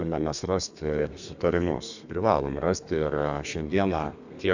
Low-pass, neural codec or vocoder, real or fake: 7.2 kHz; codec, 32 kHz, 1.9 kbps, SNAC; fake